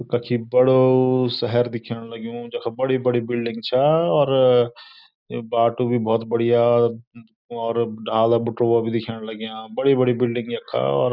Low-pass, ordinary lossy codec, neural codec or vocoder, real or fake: 5.4 kHz; none; none; real